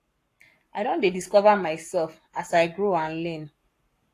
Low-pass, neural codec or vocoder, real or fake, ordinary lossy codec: 14.4 kHz; codec, 44.1 kHz, 7.8 kbps, Pupu-Codec; fake; AAC, 48 kbps